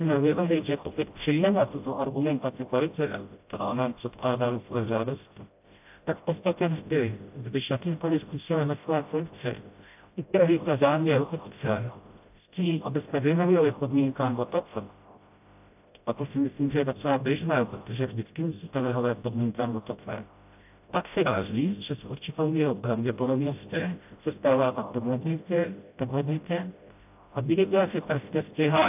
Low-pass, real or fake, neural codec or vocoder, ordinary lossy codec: 3.6 kHz; fake; codec, 16 kHz, 0.5 kbps, FreqCodec, smaller model; AAC, 32 kbps